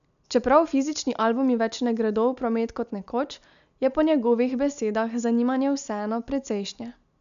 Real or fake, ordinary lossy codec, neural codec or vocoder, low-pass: real; none; none; 7.2 kHz